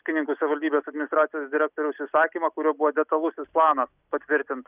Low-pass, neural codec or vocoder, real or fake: 3.6 kHz; none; real